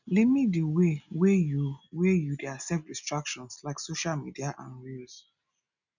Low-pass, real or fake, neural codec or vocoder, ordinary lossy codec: 7.2 kHz; real; none; none